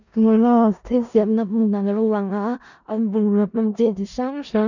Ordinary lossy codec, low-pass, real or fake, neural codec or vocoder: none; 7.2 kHz; fake; codec, 16 kHz in and 24 kHz out, 0.4 kbps, LongCat-Audio-Codec, four codebook decoder